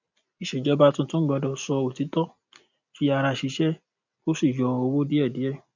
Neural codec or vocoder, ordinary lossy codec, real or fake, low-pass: none; none; real; 7.2 kHz